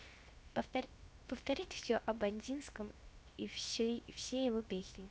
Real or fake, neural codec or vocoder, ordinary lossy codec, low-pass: fake; codec, 16 kHz, 0.7 kbps, FocalCodec; none; none